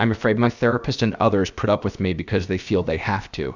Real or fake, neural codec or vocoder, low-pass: fake; codec, 16 kHz, about 1 kbps, DyCAST, with the encoder's durations; 7.2 kHz